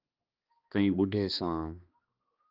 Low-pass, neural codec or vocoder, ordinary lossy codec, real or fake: 5.4 kHz; codec, 16 kHz, 2 kbps, X-Codec, HuBERT features, trained on balanced general audio; Opus, 32 kbps; fake